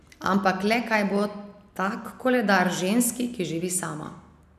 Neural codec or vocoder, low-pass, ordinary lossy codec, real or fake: none; 14.4 kHz; none; real